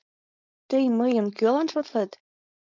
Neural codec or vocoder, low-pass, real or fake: codec, 16 kHz, 4.8 kbps, FACodec; 7.2 kHz; fake